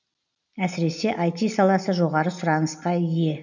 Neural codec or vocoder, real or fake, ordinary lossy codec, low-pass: none; real; none; 7.2 kHz